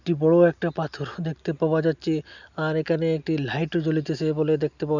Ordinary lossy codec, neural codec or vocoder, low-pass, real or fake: none; none; 7.2 kHz; real